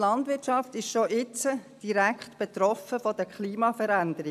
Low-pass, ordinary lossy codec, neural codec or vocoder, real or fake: 14.4 kHz; AAC, 96 kbps; none; real